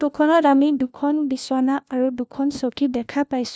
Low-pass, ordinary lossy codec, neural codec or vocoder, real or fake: none; none; codec, 16 kHz, 1 kbps, FunCodec, trained on LibriTTS, 50 frames a second; fake